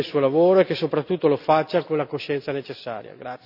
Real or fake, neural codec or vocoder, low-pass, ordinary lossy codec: real; none; 5.4 kHz; none